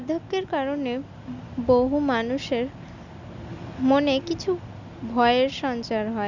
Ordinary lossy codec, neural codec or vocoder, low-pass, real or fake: none; none; 7.2 kHz; real